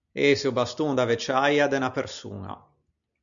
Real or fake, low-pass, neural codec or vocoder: real; 7.2 kHz; none